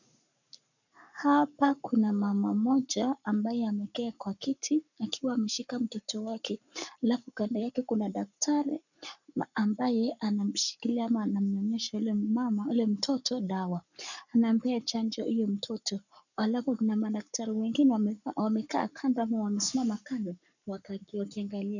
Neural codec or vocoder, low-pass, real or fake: codec, 44.1 kHz, 7.8 kbps, Pupu-Codec; 7.2 kHz; fake